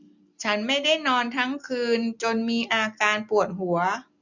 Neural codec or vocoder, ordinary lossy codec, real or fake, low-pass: none; none; real; 7.2 kHz